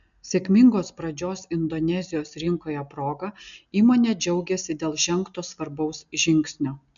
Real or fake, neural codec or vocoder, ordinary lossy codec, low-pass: real; none; MP3, 96 kbps; 7.2 kHz